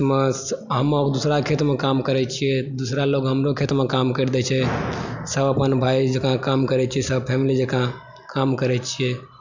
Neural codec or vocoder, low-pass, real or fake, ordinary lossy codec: none; 7.2 kHz; real; none